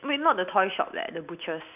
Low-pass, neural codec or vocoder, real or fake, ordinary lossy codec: 3.6 kHz; none; real; none